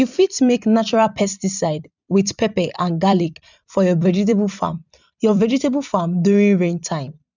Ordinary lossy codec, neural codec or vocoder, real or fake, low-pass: none; vocoder, 44.1 kHz, 128 mel bands every 256 samples, BigVGAN v2; fake; 7.2 kHz